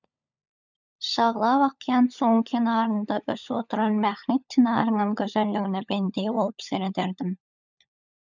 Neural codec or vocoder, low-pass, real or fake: codec, 16 kHz, 16 kbps, FunCodec, trained on LibriTTS, 50 frames a second; 7.2 kHz; fake